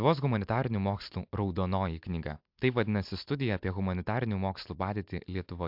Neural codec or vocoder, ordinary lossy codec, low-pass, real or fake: none; MP3, 48 kbps; 5.4 kHz; real